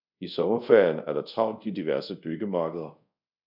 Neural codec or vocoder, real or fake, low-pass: codec, 24 kHz, 0.5 kbps, DualCodec; fake; 5.4 kHz